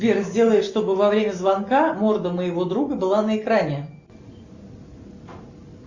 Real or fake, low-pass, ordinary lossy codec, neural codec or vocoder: real; 7.2 kHz; Opus, 64 kbps; none